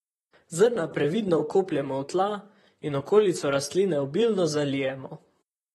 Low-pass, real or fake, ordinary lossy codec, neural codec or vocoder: 19.8 kHz; fake; AAC, 32 kbps; vocoder, 44.1 kHz, 128 mel bands, Pupu-Vocoder